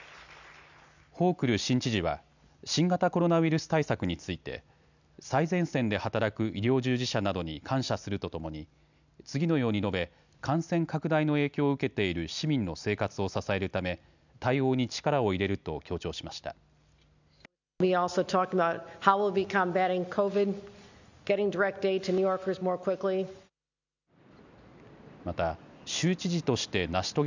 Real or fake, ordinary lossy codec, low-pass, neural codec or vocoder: real; none; 7.2 kHz; none